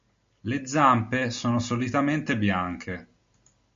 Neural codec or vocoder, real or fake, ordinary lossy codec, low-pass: none; real; MP3, 48 kbps; 7.2 kHz